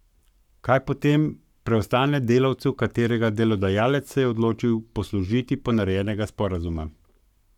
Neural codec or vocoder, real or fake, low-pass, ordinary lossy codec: codec, 44.1 kHz, 7.8 kbps, Pupu-Codec; fake; 19.8 kHz; none